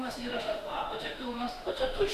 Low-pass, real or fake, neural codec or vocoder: 14.4 kHz; fake; autoencoder, 48 kHz, 32 numbers a frame, DAC-VAE, trained on Japanese speech